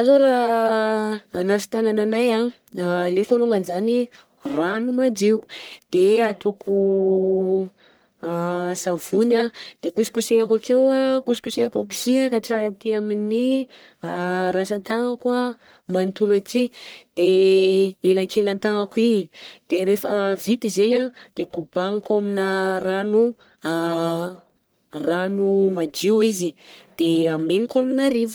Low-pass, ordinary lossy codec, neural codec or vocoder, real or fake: none; none; codec, 44.1 kHz, 1.7 kbps, Pupu-Codec; fake